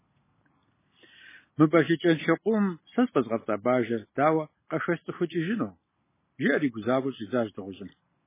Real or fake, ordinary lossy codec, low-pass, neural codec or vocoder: real; MP3, 16 kbps; 3.6 kHz; none